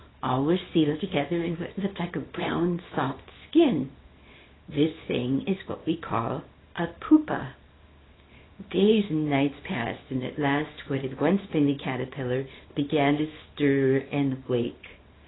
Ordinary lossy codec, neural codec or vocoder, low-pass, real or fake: AAC, 16 kbps; codec, 24 kHz, 0.9 kbps, WavTokenizer, small release; 7.2 kHz; fake